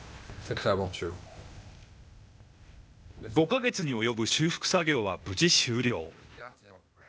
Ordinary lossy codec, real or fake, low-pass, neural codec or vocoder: none; fake; none; codec, 16 kHz, 0.8 kbps, ZipCodec